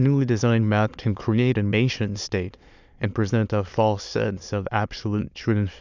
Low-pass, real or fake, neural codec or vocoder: 7.2 kHz; fake; autoencoder, 22.05 kHz, a latent of 192 numbers a frame, VITS, trained on many speakers